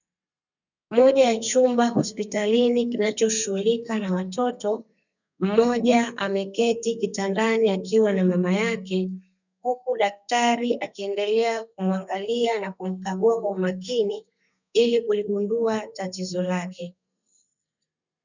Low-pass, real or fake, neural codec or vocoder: 7.2 kHz; fake; codec, 32 kHz, 1.9 kbps, SNAC